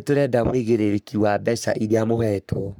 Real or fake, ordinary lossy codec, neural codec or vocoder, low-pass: fake; none; codec, 44.1 kHz, 3.4 kbps, Pupu-Codec; none